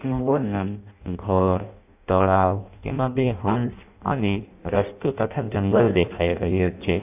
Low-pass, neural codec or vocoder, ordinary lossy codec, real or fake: 3.6 kHz; codec, 16 kHz in and 24 kHz out, 0.6 kbps, FireRedTTS-2 codec; none; fake